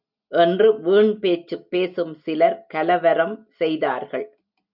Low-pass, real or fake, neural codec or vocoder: 5.4 kHz; real; none